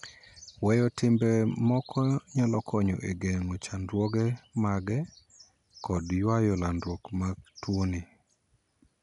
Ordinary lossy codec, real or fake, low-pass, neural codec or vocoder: none; real; 10.8 kHz; none